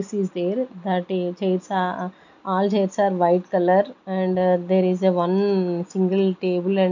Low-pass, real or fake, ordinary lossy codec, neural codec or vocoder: 7.2 kHz; real; none; none